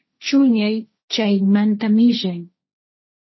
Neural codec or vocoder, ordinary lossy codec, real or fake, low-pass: codec, 16 kHz, 1.1 kbps, Voila-Tokenizer; MP3, 24 kbps; fake; 7.2 kHz